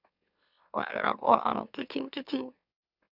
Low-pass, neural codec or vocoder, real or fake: 5.4 kHz; autoencoder, 44.1 kHz, a latent of 192 numbers a frame, MeloTTS; fake